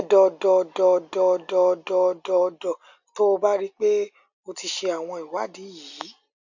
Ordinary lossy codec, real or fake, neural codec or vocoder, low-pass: none; real; none; 7.2 kHz